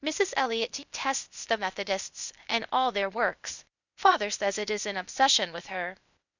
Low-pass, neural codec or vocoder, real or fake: 7.2 kHz; codec, 16 kHz, 0.8 kbps, ZipCodec; fake